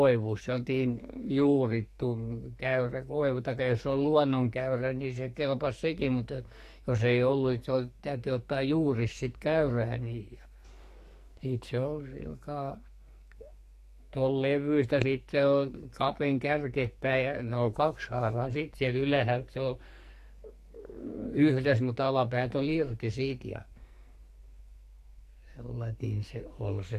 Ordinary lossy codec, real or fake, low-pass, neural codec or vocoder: AAC, 64 kbps; fake; 14.4 kHz; codec, 44.1 kHz, 2.6 kbps, SNAC